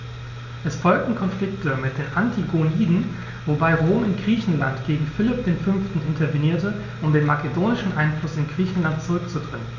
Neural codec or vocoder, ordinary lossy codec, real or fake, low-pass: none; none; real; 7.2 kHz